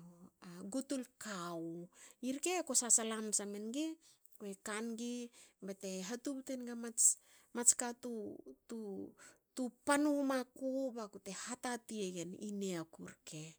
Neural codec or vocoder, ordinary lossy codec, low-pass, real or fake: none; none; none; real